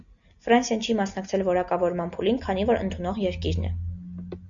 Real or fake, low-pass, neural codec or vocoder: real; 7.2 kHz; none